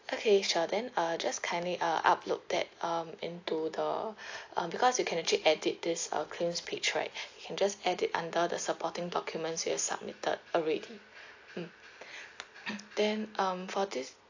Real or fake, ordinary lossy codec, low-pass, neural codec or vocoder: real; AAC, 48 kbps; 7.2 kHz; none